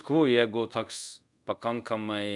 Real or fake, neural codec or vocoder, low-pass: fake; codec, 24 kHz, 0.5 kbps, DualCodec; 10.8 kHz